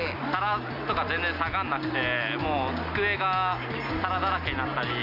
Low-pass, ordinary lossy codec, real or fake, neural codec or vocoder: 5.4 kHz; none; real; none